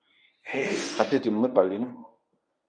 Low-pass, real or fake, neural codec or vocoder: 9.9 kHz; fake; codec, 24 kHz, 0.9 kbps, WavTokenizer, medium speech release version 1